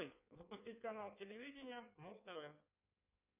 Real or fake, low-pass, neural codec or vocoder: fake; 3.6 kHz; codec, 16 kHz in and 24 kHz out, 1.1 kbps, FireRedTTS-2 codec